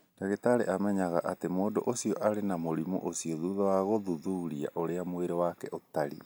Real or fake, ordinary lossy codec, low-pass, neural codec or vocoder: real; none; none; none